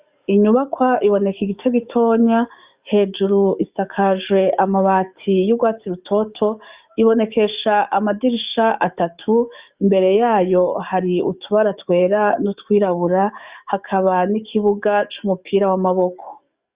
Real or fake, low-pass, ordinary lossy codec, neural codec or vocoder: fake; 3.6 kHz; Opus, 64 kbps; codec, 44.1 kHz, 7.8 kbps, DAC